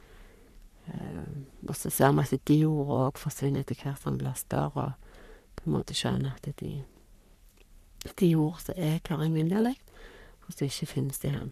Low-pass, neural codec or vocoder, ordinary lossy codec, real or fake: 14.4 kHz; codec, 44.1 kHz, 3.4 kbps, Pupu-Codec; none; fake